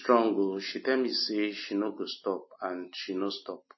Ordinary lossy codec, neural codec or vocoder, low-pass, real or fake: MP3, 24 kbps; none; 7.2 kHz; real